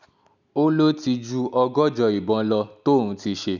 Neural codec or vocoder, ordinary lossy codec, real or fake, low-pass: none; none; real; 7.2 kHz